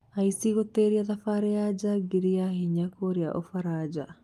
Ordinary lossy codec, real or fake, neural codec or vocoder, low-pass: Opus, 32 kbps; real; none; 14.4 kHz